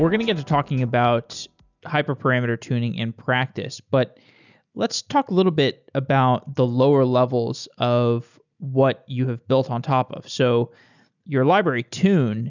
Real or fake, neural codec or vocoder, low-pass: real; none; 7.2 kHz